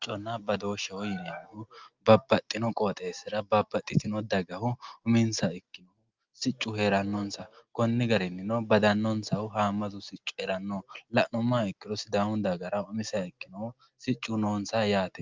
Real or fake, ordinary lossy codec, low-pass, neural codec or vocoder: real; Opus, 24 kbps; 7.2 kHz; none